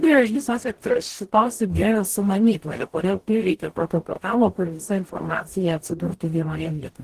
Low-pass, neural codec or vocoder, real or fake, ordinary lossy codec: 14.4 kHz; codec, 44.1 kHz, 0.9 kbps, DAC; fake; Opus, 16 kbps